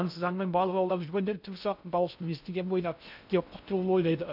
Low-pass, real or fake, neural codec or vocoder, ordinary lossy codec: 5.4 kHz; fake; codec, 16 kHz in and 24 kHz out, 0.6 kbps, FocalCodec, streaming, 2048 codes; none